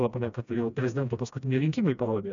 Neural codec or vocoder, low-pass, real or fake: codec, 16 kHz, 1 kbps, FreqCodec, smaller model; 7.2 kHz; fake